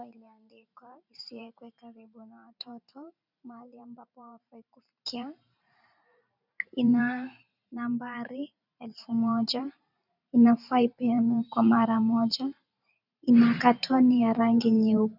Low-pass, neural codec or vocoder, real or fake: 5.4 kHz; vocoder, 44.1 kHz, 128 mel bands every 512 samples, BigVGAN v2; fake